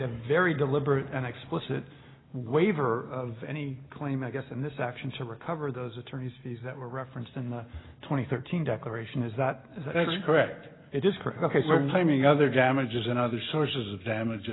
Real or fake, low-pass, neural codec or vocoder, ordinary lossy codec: real; 7.2 kHz; none; AAC, 16 kbps